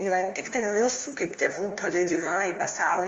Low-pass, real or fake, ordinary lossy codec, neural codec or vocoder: 7.2 kHz; fake; Opus, 32 kbps; codec, 16 kHz, 1 kbps, FunCodec, trained on LibriTTS, 50 frames a second